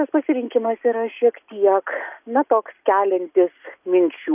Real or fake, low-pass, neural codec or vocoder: real; 3.6 kHz; none